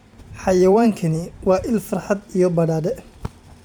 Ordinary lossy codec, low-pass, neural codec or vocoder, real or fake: none; 19.8 kHz; vocoder, 44.1 kHz, 128 mel bands every 512 samples, BigVGAN v2; fake